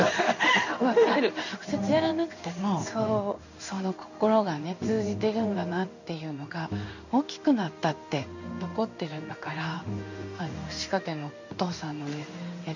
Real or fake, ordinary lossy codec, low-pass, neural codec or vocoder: fake; none; 7.2 kHz; codec, 16 kHz in and 24 kHz out, 1 kbps, XY-Tokenizer